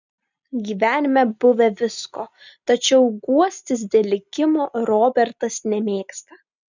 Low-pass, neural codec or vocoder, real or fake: 7.2 kHz; none; real